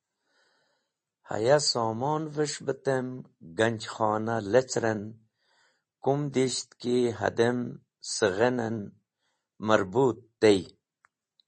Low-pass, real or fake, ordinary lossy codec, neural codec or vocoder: 10.8 kHz; real; MP3, 32 kbps; none